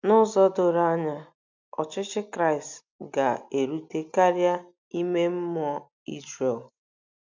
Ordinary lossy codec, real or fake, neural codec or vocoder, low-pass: MP3, 64 kbps; real; none; 7.2 kHz